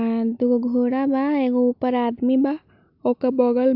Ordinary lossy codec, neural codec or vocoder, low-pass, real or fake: none; none; 5.4 kHz; real